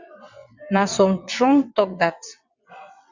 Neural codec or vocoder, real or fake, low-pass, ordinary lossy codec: vocoder, 22.05 kHz, 80 mel bands, WaveNeXt; fake; 7.2 kHz; Opus, 64 kbps